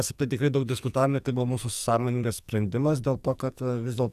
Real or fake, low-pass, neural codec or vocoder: fake; 14.4 kHz; codec, 44.1 kHz, 2.6 kbps, SNAC